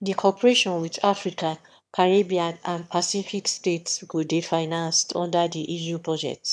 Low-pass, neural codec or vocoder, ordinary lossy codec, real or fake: none; autoencoder, 22.05 kHz, a latent of 192 numbers a frame, VITS, trained on one speaker; none; fake